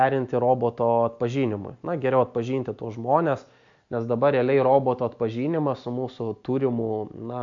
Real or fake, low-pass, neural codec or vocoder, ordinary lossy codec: real; 7.2 kHz; none; AAC, 64 kbps